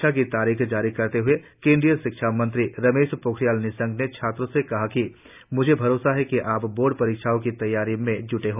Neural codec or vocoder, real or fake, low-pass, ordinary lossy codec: none; real; 3.6 kHz; none